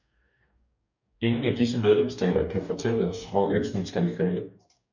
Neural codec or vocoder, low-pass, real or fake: codec, 44.1 kHz, 2.6 kbps, DAC; 7.2 kHz; fake